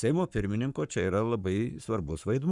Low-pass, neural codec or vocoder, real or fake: 10.8 kHz; codec, 44.1 kHz, 7.8 kbps, Pupu-Codec; fake